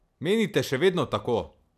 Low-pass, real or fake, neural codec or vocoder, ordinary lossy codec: 14.4 kHz; real; none; none